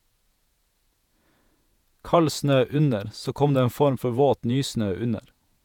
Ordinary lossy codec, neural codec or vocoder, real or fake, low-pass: none; vocoder, 48 kHz, 128 mel bands, Vocos; fake; 19.8 kHz